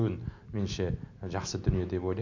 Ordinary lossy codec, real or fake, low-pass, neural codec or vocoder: none; real; 7.2 kHz; none